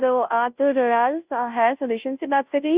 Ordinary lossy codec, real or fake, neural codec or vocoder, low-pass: Opus, 64 kbps; fake; codec, 16 kHz, 0.5 kbps, FunCodec, trained on Chinese and English, 25 frames a second; 3.6 kHz